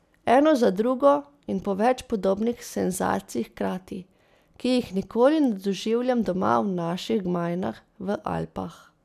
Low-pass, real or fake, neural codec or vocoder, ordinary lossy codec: 14.4 kHz; real; none; none